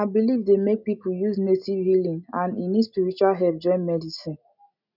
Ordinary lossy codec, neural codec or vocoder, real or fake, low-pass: none; none; real; 5.4 kHz